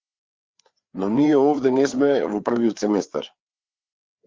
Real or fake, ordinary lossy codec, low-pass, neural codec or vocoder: fake; Opus, 32 kbps; 7.2 kHz; codec, 16 kHz, 4 kbps, FreqCodec, larger model